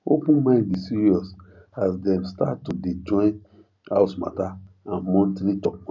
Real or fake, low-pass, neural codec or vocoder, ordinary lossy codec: real; 7.2 kHz; none; none